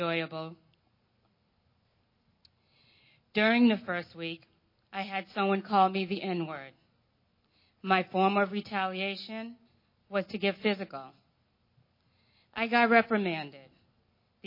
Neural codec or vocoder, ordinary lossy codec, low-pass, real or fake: none; MP3, 24 kbps; 5.4 kHz; real